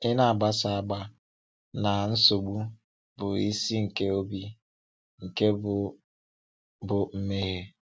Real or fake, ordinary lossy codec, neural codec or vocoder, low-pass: real; none; none; none